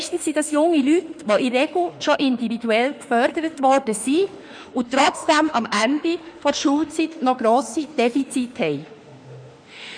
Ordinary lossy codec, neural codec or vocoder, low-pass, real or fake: none; autoencoder, 48 kHz, 32 numbers a frame, DAC-VAE, trained on Japanese speech; 9.9 kHz; fake